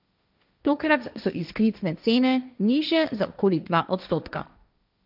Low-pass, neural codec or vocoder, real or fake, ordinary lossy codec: 5.4 kHz; codec, 16 kHz, 1.1 kbps, Voila-Tokenizer; fake; none